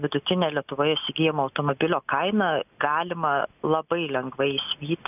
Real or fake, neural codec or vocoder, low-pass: real; none; 3.6 kHz